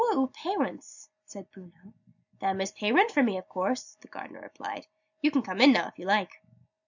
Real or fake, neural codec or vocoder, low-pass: real; none; 7.2 kHz